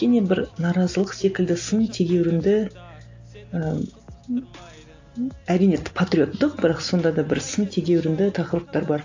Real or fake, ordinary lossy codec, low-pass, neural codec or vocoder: real; AAC, 48 kbps; 7.2 kHz; none